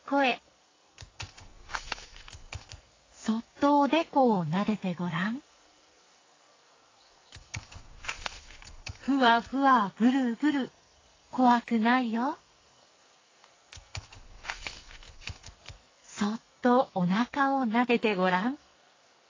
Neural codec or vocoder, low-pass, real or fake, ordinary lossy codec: codec, 44.1 kHz, 2.6 kbps, SNAC; 7.2 kHz; fake; AAC, 32 kbps